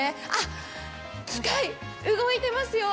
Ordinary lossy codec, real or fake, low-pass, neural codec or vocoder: none; real; none; none